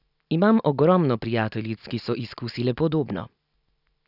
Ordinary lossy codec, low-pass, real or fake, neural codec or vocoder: none; 5.4 kHz; real; none